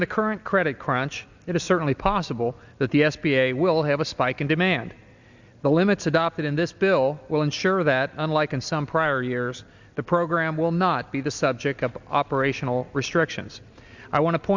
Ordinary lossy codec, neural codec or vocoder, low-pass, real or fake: Opus, 64 kbps; none; 7.2 kHz; real